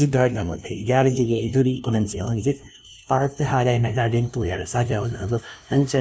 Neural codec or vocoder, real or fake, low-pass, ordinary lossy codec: codec, 16 kHz, 0.5 kbps, FunCodec, trained on LibriTTS, 25 frames a second; fake; none; none